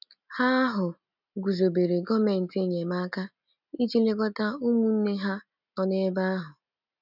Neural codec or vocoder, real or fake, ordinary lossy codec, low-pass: none; real; none; 5.4 kHz